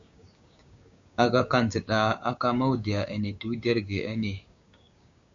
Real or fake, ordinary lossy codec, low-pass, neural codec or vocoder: fake; MP3, 64 kbps; 7.2 kHz; codec, 16 kHz, 6 kbps, DAC